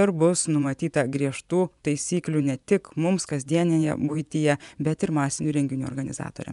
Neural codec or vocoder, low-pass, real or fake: vocoder, 24 kHz, 100 mel bands, Vocos; 10.8 kHz; fake